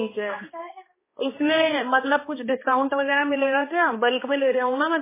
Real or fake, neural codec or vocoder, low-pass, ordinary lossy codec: fake; codec, 16 kHz, 2 kbps, X-Codec, HuBERT features, trained on balanced general audio; 3.6 kHz; MP3, 16 kbps